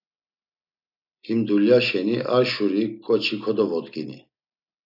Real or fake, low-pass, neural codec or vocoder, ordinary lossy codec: real; 5.4 kHz; none; AAC, 48 kbps